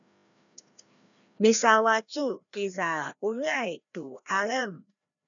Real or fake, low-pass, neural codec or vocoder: fake; 7.2 kHz; codec, 16 kHz, 1 kbps, FreqCodec, larger model